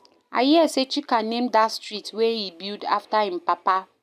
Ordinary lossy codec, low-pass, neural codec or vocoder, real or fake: none; 14.4 kHz; none; real